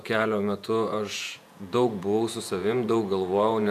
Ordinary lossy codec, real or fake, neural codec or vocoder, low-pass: AAC, 96 kbps; fake; vocoder, 48 kHz, 128 mel bands, Vocos; 14.4 kHz